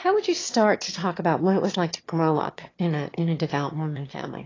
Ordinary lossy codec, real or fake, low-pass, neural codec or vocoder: AAC, 32 kbps; fake; 7.2 kHz; autoencoder, 22.05 kHz, a latent of 192 numbers a frame, VITS, trained on one speaker